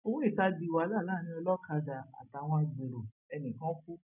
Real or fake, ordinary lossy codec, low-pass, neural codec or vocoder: real; none; 3.6 kHz; none